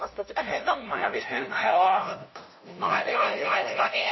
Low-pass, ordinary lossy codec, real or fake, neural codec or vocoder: 7.2 kHz; MP3, 24 kbps; fake; codec, 16 kHz, 0.5 kbps, FunCodec, trained on LibriTTS, 25 frames a second